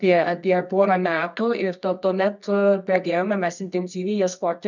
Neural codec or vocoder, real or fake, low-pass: codec, 24 kHz, 0.9 kbps, WavTokenizer, medium music audio release; fake; 7.2 kHz